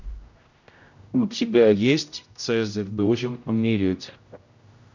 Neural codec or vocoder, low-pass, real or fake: codec, 16 kHz, 0.5 kbps, X-Codec, HuBERT features, trained on general audio; 7.2 kHz; fake